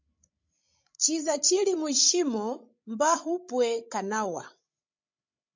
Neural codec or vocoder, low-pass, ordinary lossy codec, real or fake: codec, 16 kHz, 16 kbps, FreqCodec, larger model; 7.2 kHz; MP3, 64 kbps; fake